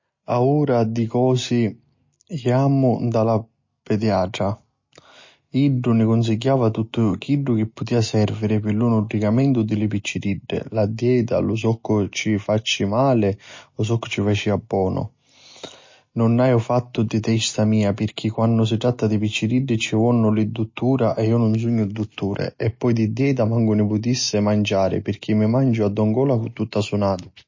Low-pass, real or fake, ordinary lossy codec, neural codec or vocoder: 7.2 kHz; real; MP3, 32 kbps; none